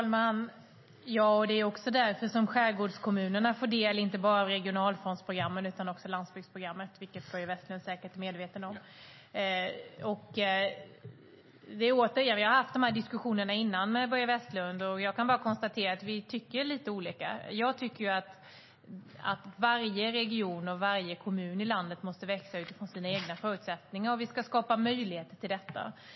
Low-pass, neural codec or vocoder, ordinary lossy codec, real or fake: 7.2 kHz; none; MP3, 24 kbps; real